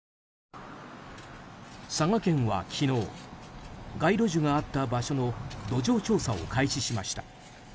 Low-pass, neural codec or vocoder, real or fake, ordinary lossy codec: none; none; real; none